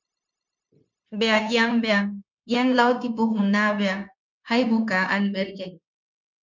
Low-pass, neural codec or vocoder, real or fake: 7.2 kHz; codec, 16 kHz, 0.9 kbps, LongCat-Audio-Codec; fake